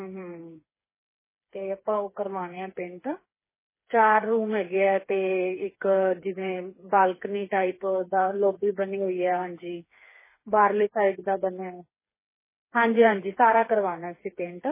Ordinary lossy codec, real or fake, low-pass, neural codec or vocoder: MP3, 16 kbps; fake; 3.6 kHz; codec, 16 kHz, 4 kbps, FreqCodec, smaller model